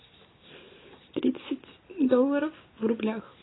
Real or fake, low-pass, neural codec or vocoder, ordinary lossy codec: real; 7.2 kHz; none; AAC, 16 kbps